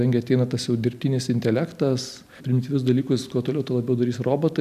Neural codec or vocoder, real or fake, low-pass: none; real; 14.4 kHz